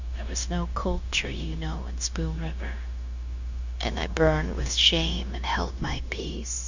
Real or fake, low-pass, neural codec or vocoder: fake; 7.2 kHz; codec, 16 kHz, 0.9 kbps, LongCat-Audio-Codec